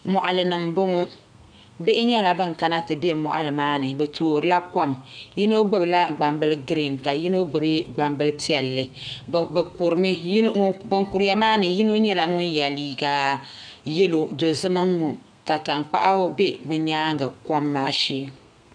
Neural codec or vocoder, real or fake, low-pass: codec, 32 kHz, 1.9 kbps, SNAC; fake; 9.9 kHz